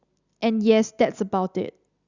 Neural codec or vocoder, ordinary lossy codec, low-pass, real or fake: none; Opus, 64 kbps; 7.2 kHz; real